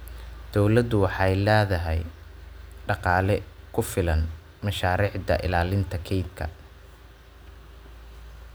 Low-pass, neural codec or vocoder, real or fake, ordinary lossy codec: none; none; real; none